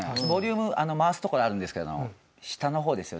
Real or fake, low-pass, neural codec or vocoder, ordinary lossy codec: real; none; none; none